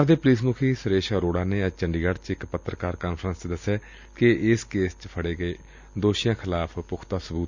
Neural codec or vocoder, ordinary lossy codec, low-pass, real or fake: none; Opus, 64 kbps; 7.2 kHz; real